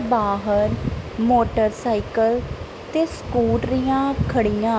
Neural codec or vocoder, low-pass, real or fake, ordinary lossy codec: none; none; real; none